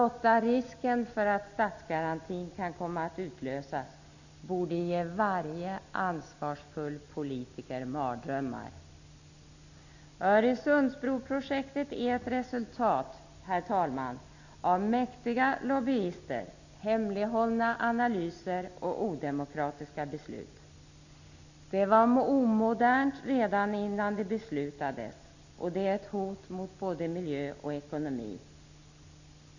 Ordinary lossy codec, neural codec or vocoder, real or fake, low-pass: none; none; real; 7.2 kHz